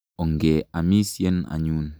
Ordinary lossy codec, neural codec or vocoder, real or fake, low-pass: none; none; real; none